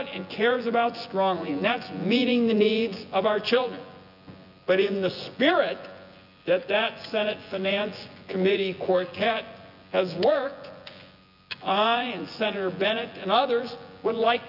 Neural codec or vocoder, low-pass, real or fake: vocoder, 24 kHz, 100 mel bands, Vocos; 5.4 kHz; fake